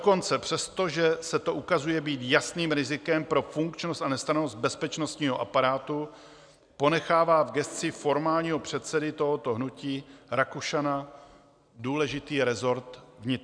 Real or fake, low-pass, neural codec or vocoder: real; 9.9 kHz; none